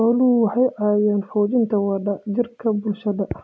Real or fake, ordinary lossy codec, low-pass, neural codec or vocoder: real; none; none; none